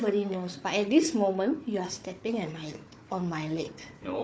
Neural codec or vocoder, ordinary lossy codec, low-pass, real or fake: codec, 16 kHz, 4 kbps, FunCodec, trained on Chinese and English, 50 frames a second; none; none; fake